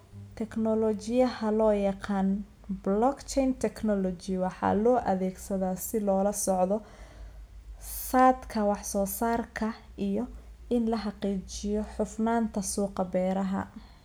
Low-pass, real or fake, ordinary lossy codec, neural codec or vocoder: none; real; none; none